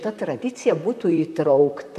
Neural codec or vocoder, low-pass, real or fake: vocoder, 44.1 kHz, 128 mel bands, Pupu-Vocoder; 14.4 kHz; fake